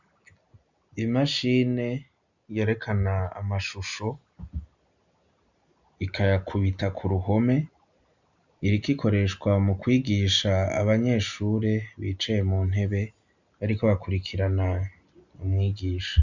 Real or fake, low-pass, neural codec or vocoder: real; 7.2 kHz; none